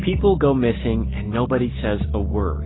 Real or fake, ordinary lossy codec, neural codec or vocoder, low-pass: real; AAC, 16 kbps; none; 7.2 kHz